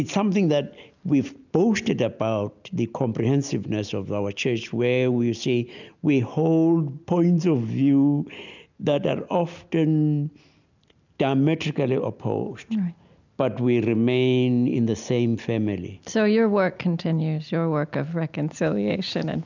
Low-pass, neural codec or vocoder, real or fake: 7.2 kHz; none; real